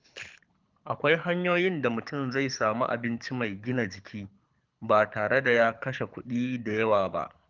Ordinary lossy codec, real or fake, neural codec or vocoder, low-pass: Opus, 24 kbps; fake; codec, 24 kHz, 6 kbps, HILCodec; 7.2 kHz